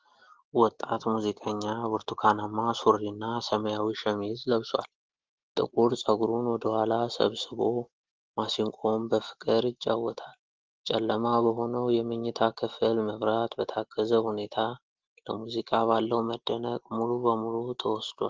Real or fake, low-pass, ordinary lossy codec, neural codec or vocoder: real; 7.2 kHz; Opus, 16 kbps; none